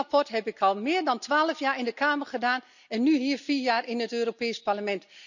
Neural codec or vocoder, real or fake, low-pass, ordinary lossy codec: none; real; 7.2 kHz; none